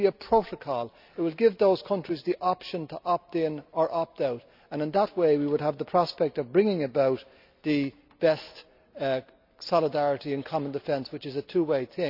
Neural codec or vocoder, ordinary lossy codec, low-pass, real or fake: none; none; 5.4 kHz; real